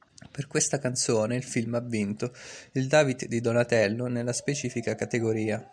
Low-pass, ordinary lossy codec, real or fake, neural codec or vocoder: 9.9 kHz; MP3, 96 kbps; real; none